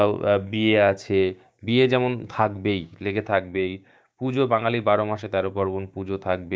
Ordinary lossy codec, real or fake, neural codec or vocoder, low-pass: none; fake; codec, 16 kHz, 6 kbps, DAC; none